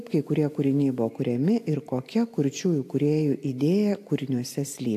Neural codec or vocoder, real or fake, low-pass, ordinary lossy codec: none; real; 14.4 kHz; AAC, 64 kbps